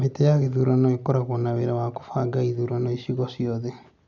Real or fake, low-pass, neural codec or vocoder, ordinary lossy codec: real; 7.2 kHz; none; none